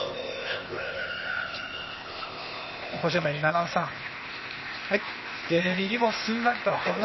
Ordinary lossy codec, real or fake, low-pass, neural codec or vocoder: MP3, 24 kbps; fake; 7.2 kHz; codec, 16 kHz, 0.8 kbps, ZipCodec